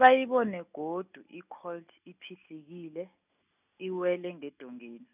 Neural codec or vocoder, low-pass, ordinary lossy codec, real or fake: none; 3.6 kHz; none; real